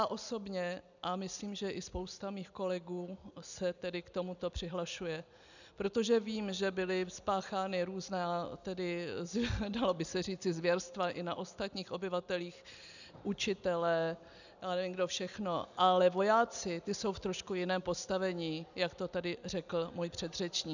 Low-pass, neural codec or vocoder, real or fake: 7.2 kHz; none; real